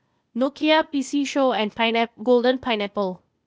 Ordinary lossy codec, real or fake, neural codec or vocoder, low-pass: none; fake; codec, 16 kHz, 0.8 kbps, ZipCodec; none